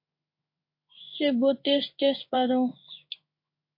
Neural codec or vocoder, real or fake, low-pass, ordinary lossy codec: codec, 16 kHz in and 24 kHz out, 1 kbps, XY-Tokenizer; fake; 5.4 kHz; MP3, 32 kbps